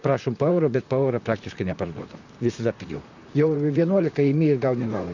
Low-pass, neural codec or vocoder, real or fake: 7.2 kHz; vocoder, 44.1 kHz, 128 mel bands, Pupu-Vocoder; fake